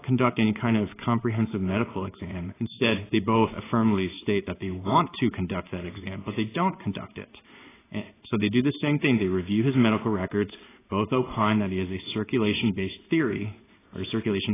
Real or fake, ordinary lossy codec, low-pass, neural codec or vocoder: fake; AAC, 16 kbps; 3.6 kHz; codec, 16 kHz in and 24 kHz out, 1 kbps, XY-Tokenizer